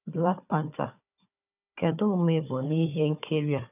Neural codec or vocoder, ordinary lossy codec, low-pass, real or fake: codec, 16 kHz, 4 kbps, FunCodec, trained on Chinese and English, 50 frames a second; none; 3.6 kHz; fake